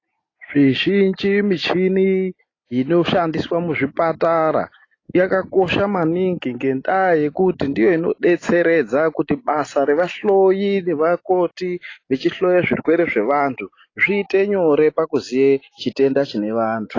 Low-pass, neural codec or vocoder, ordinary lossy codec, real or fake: 7.2 kHz; none; AAC, 32 kbps; real